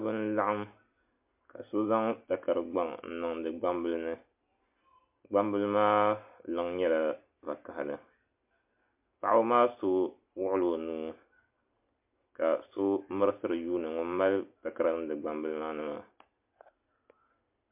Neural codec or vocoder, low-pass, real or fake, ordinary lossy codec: none; 3.6 kHz; real; MP3, 32 kbps